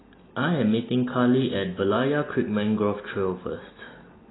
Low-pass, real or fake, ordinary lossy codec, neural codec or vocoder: 7.2 kHz; real; AAC, 16 kbps; none